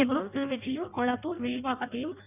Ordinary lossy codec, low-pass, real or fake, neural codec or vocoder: none; 3.6 kHz; fake; codec, 16 kHz in and 24 kHz out, 0.6 kbps, FireRedTTS-2 codec